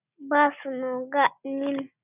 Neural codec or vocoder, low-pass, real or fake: none; 3.6 kHz; real